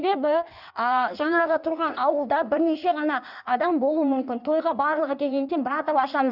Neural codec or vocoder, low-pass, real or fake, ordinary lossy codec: codec, 16 kHz in and 24 kHz out, 1.1 kbps, FireRedTTS-2 codec; 5.4 kHz; fake; none